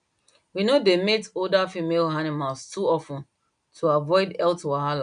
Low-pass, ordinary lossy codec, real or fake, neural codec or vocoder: 9.9 kHz; none; real; none